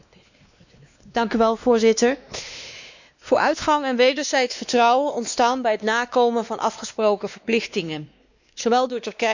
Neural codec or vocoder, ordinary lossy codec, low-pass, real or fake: codec, 16 kHz, 2 kbps, X-Codec, WavLM features, trained on Multilingual LibriSpeech; none; 7.2 kHz; fake